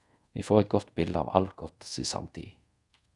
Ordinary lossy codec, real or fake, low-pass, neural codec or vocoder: Opus, 64 kbps; fake; 10.8 kHz; codec, 24 kHz, 0.5 kbps, DualCodec